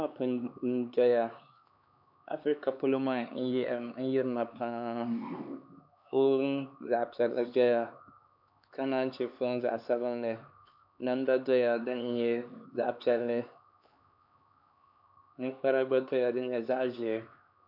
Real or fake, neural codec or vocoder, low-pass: fake; codec, 16 kHz, 4 kbps, X-Codec, HuBERT features, trained on LibriSpeech; 5.4 kHz